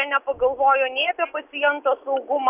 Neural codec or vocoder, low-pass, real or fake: none; 3.6 kHz; real